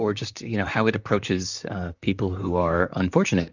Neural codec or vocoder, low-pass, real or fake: vocoder, 44.1 kHz, 128 mel bands, Pupu-Vocoder; 7.2 kHz; fake